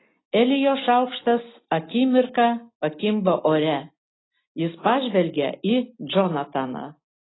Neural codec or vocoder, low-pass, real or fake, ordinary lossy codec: none; 7.2 kHz; real; AAC, 16 kbps